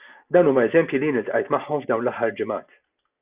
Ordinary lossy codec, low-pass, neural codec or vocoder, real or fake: Opus, 64 kbps; 3.6 kHz; none; real